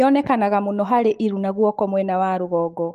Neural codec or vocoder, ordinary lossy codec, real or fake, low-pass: autoencoder, 48 kHz, 128 numbers a frame, DAC-VAE, trained on Japanese speech; Opus, 24 kbps; fake; 14.4 kHz